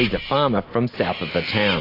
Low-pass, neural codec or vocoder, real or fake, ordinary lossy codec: 5.4 kHz; none; real; AAC, 24 kbps